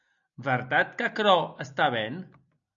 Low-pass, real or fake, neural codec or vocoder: 7.2 kHz; real; none